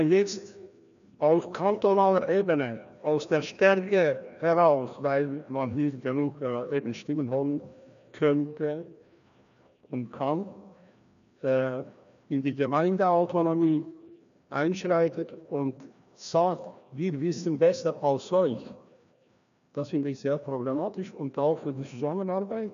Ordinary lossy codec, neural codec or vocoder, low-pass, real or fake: none; codec, 16 kHz, 1 kbps, FreqCodec, larger model; 7.2 kHz; fake